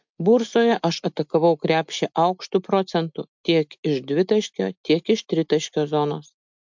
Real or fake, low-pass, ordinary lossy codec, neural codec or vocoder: real; 7.2 kHz; MP3, 64 kbps; none